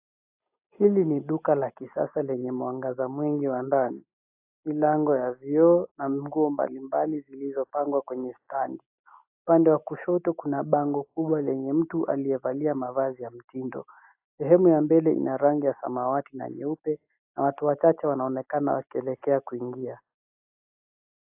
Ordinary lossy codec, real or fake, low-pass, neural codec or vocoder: AAC, 32 kbps; real; 3.6 kHz; none